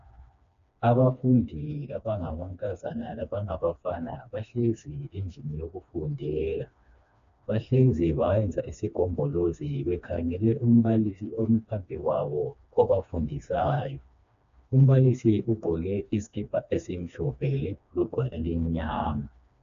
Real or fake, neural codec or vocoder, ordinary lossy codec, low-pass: fake; codec, 16 kHz, 2 kbps, FreqCodec, smaller model; AAC, 64 kbps; 7.2 kHz